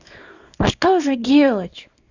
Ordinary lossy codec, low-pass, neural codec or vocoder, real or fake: Opus, 64 kbps; 7.2 kHz; codec, 24 kHz, 0.9 kbps, WavTokenizer, small release; fake